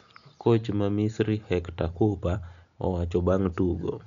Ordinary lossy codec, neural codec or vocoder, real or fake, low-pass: none; none; real; 7.2 kHz